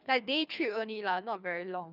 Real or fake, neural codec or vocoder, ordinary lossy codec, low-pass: fake; codec, 24 kHz, 3 kbps, HILCodec; none; 5.4 kHz